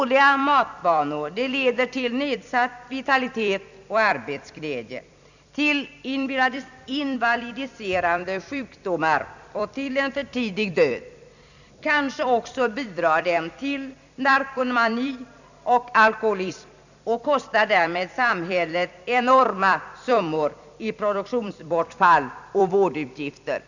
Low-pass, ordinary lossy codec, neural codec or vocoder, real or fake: 7.2 kHz; none; vocoder, 44.1 kHz, 128 mel bands every 256 samples, BigVGAN v2; fake